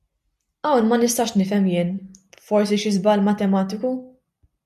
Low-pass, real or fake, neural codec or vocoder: 14.4 kHz; real; none